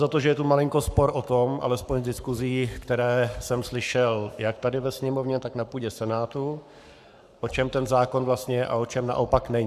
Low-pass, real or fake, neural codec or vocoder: 14.4 kHz; fake; codec, 44.1 kHz, 7.8 kbps, Pupu-Codec